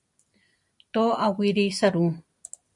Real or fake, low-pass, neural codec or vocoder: real; 10.8 kHz; none